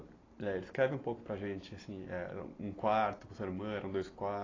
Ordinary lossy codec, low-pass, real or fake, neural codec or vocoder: AAC, 32 kbps; 7.2 kHz; real; none